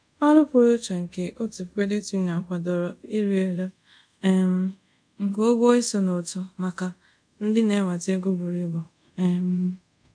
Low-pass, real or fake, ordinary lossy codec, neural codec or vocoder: 9.9 kHz; fake; none; codec, 24 kHz, 0.5 kbps, DualCodec